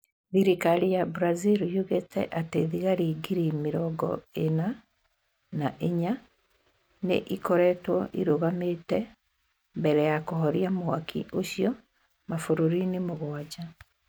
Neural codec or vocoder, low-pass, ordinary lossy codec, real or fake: none; none; none; real